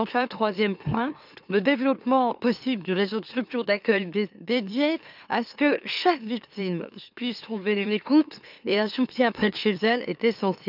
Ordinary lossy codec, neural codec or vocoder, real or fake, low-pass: none; autoencoder, 44.1 kHz, a latent of 192 numbers a frame, MeloTTS; fake; 5.4 kHz